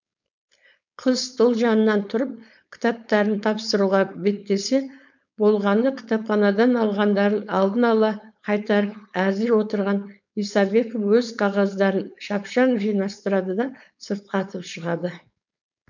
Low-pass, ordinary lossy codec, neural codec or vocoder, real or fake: 7.2 kHz; none; codec, 16 kHz, 4.8 kbps, FACodec; fake